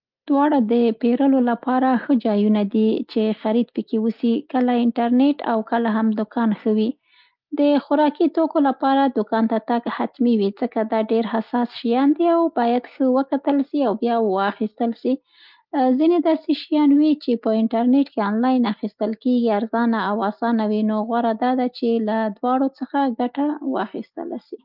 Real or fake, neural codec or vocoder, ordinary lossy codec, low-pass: real; none; Opus, 32 kbps; 5.4 kHz